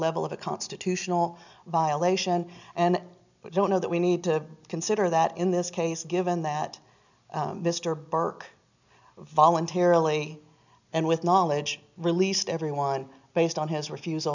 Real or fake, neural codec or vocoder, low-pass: real; none; 7.2 kHz